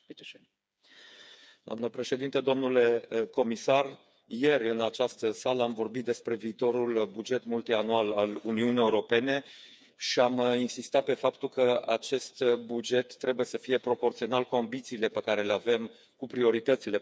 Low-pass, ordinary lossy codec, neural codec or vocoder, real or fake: none; none; codec, 16 kHz, 4 kbps, FreqCodec, smaller model; fake